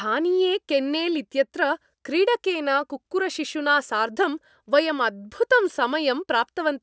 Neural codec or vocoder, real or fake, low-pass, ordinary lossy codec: none; real; none; none